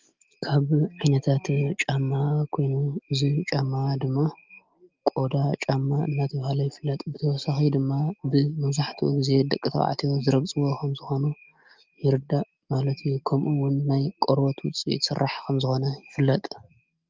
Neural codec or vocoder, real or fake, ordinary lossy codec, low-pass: none; real; Opus, 24 kbps; 7.2 kHz